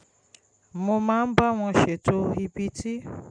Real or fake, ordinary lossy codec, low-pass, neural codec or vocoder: real; none; 9.9 kHz; none